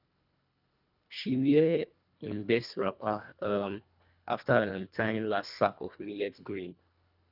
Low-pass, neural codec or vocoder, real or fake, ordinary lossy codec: 5.4 kHz; codec, 24 kHz, 1.5 kbps, HILCodec; fake; none